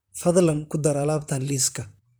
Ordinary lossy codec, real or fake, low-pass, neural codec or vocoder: none; fake; none; vocoder, 44.1 kHz, 128 mel bands, Pupu-Vocoder